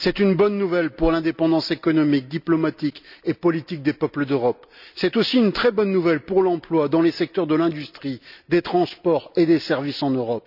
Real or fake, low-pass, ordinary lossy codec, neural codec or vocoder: real; 5.4 kHz; none; none